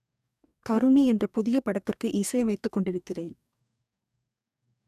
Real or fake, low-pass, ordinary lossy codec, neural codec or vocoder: fake; 14.4 kHz; none; codec, 44.1 kHz, 2.6 kbps, DAC